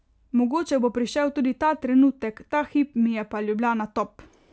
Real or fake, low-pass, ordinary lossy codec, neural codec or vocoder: real; none; none; none